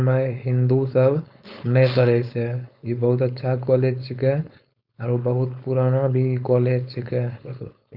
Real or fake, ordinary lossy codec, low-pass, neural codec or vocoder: fake; none; 5.4 kHz; codec, 16 kHz, 4.8 kbps, FACodec